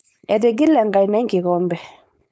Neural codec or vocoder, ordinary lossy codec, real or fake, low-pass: codec, 16 kHz, 4.8 kbps, FACodec; none; fake; none